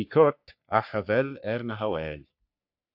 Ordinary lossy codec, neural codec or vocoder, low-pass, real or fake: AAC, 48 kbps; codec, 44.1 kHz, 3.4 kbps, Pupu-Codec; 5.4 kHz; fake